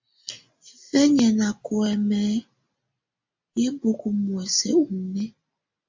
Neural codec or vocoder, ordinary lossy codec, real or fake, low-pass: none; MP3, 64 kbps; real; 7.2 kHz